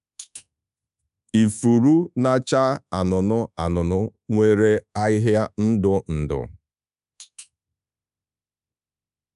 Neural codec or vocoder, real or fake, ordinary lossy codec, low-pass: codec, 24 kHz, 1.2 kbps, DualCodec; fake; none; 10.8 kHz